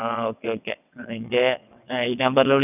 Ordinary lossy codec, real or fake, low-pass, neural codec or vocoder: none; fake; 3.6 kHz; vocoder, 22.05 kHz, 80 mel bands, WaveNeXt